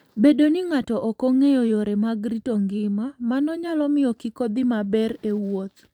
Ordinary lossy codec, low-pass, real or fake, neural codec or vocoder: none; 19.8 kHz; fake; vocoder, 44.1 kHz, 128 mel bands every 512 samples, BigVGAN v2